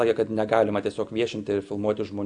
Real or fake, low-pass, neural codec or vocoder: real; 9.9 kHz; none